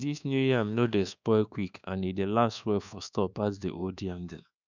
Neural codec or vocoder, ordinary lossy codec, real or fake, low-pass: codec, 24 kHz, 1.2 kbps, DualCodec; none; fake; 7.2 kHz